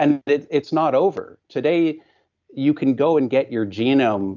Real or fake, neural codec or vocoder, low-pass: real; none; 7.2 kHz